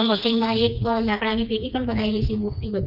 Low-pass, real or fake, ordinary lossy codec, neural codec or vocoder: 5.4 kHz; fake; none; codec, 16 kHz, 2 kbps, FreqCodec, smaller model